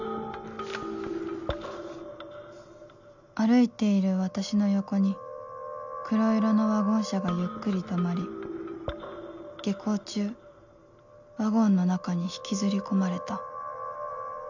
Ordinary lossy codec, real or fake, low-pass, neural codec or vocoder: none; real; 7.2 kHz; none